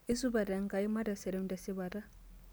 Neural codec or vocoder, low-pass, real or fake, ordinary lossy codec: none; none; real; none